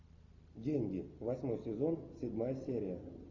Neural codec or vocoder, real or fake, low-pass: none; real; 7.2 kHz